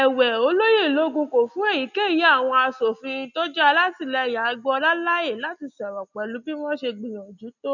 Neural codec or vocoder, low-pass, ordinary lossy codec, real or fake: none; 7.2 kHz; none; real